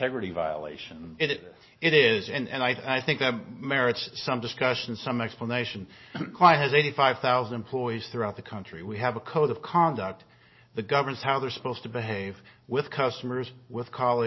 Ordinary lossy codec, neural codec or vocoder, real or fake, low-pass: MP3, 24 kbps; none; real; 7.2 kHz